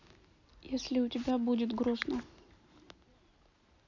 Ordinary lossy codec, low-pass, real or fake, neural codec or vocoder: none; 7.2 kHz; real; none